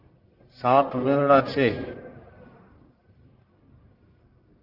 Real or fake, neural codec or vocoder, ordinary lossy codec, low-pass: fake; codec, 44.1 kHz, 1.7 kbps, Pupu-Codec; Opus, 32 kbps; 5.4 kHz